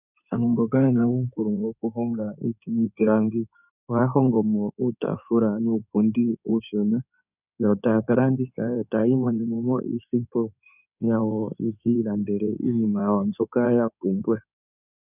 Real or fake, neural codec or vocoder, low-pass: fake; codec, 16 kHz in and 24 kHz out, 2.2 kbps, FireRedTTS-2 codec; 3.6 kHz